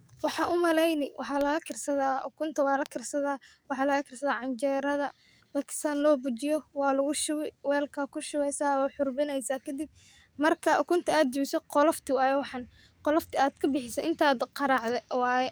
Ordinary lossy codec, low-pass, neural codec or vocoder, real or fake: none; none; codec, 44.1 kHz, 7.8 kbps, DAC; fake